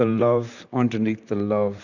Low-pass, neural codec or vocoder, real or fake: 7.2 kHz; vocoder, 44.1 kHz, 128 mel bands, Pupu-Vocoder; fake